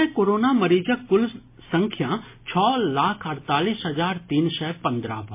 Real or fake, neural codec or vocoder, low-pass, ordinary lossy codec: real; none; 3.6 kHz; MP3, 24 kbps